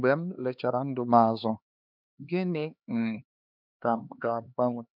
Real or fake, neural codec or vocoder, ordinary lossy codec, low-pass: fake; codec, 16 kHz, 2 kbps, X-Codec, HuBERT features, trained on LibriSpeech; none; 5.4 kHz